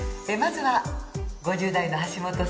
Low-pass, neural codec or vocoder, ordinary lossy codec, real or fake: none; none; none; real